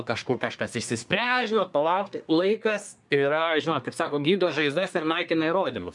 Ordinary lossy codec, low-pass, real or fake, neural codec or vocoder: MP3, 96 kbps; 10.8 kHz; fake; codec, 24 kHz, 1 kbps, SNAC